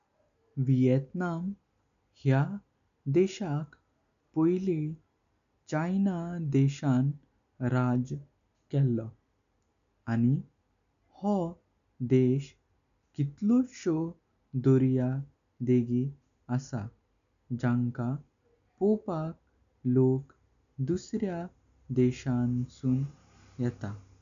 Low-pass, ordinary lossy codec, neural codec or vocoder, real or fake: 7.2 kHz; none; none; real